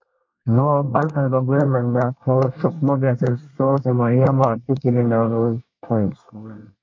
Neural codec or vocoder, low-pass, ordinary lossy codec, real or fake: codec, 24 kHz, 1 kbps, SNAC; 7.2 kHz; MP3, 48 kbps; fake